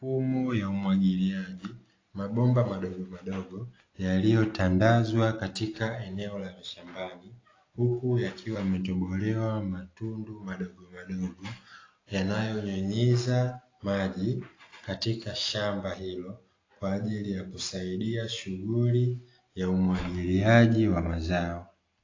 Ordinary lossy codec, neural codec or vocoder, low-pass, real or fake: AAC, 32 kbps; none; 7.2 kHz; real